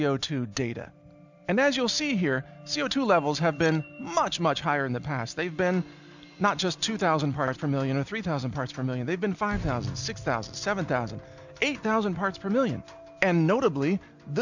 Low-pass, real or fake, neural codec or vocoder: 7.2 kHz; real; none